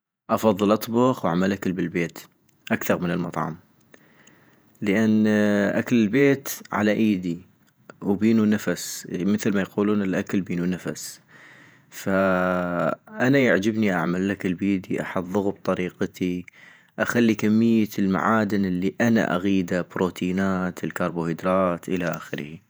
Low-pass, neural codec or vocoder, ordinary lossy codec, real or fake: none; vocoder, 48 kHz, 128 mel bands, Vocos; none; fake